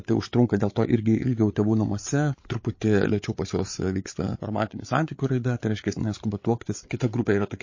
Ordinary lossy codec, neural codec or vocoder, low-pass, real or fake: MP3, 32 kbps; codec, 16 kHz, 8 kbps, FreqCodec, larger model; 7.2 kHz; fake